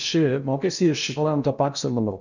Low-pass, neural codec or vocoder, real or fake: 7.2 kHz; codec, 16 kHz in and 24 kHz out, 0.8 kbps, FocalCodec, streaming, 65536 codes; fake